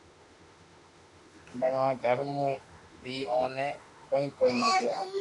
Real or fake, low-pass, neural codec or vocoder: fake; 10.8 kHz; autoencoder, 48 kHz, 32 numbers a frame, DAC-VAE, trained on Japanese speech